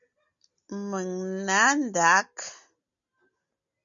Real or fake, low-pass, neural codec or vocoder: real; 7.2 kHz; none